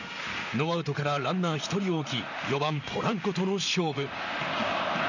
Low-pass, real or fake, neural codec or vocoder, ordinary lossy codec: 7.2 kHz; fake; vocoder, 44.1 kHz, 128 mel bands, Pupu-Vocoder; none